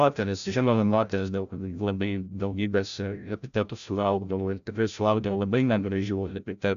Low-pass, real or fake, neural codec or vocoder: 7.2 kHz; fake; codec, 16 kHz, 0.5 kbps, FreqCodec, larger model